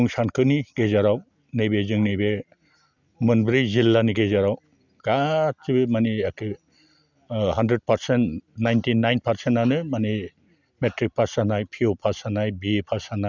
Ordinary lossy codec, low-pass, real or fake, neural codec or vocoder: none; 7.2 kHz; real; none